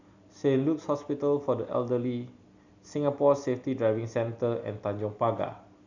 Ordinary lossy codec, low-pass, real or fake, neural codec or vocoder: none; 7.2 kHz; real; none